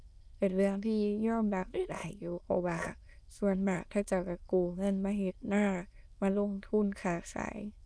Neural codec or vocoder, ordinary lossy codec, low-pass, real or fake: autoencoder, 22.05 kHz, a latent of 192 numbers a frame, VITS, trained on many speakers; none; none; fake